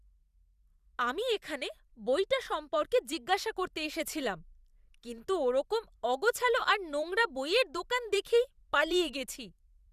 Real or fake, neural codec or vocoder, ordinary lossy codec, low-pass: fake; vocoder, 44.1 kHz, 128 mel bands every 512 samples, BigVGAN v2; Opus, 64 kbps; 14.4 kHz